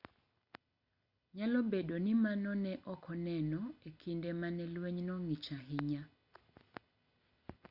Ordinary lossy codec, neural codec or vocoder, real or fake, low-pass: Opus, 64 kbps; none; real; 5.4 kHz